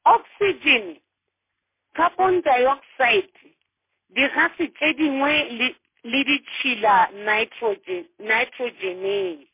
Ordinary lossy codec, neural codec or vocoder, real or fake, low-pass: MP3, 24 kbps; none; real; 3.6 kHz